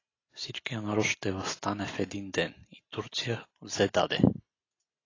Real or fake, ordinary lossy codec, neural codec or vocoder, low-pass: real; AAC, 32 kbps; none; 7.2 kHz